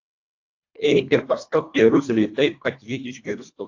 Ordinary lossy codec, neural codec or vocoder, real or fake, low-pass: AAC, 48 kbps; codec, 24 kHz, 1.5 kbps, HILCodec; fake; 7.2 kHz